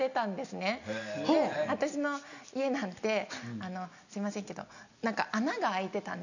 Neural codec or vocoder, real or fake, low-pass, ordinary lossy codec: none; real; 7.2 kHz; none